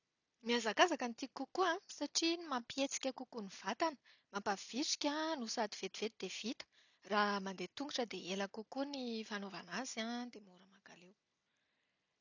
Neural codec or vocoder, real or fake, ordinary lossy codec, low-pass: none; real; none; 7.2 kHz